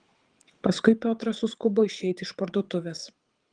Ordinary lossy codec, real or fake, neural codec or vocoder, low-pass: Opus, 24 kbps; fake; codec, 24 kHz, 6 kbps, HILCodec; 9.9 kHz